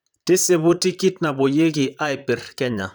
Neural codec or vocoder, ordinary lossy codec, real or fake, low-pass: vocoder, 44.1 kHz, 128 mel bands, Pupu-Vocoder; none; fake; none